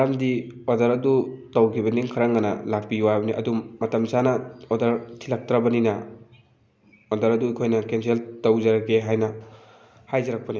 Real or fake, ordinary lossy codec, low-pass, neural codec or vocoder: real; none; none; none